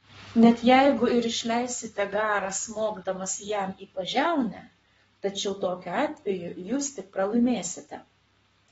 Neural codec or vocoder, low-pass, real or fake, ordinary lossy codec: codec, 44.1 kHz, 7.8 kbps, Pupu-Codec; 19.8 kHz; fake; AAC, 24 kbps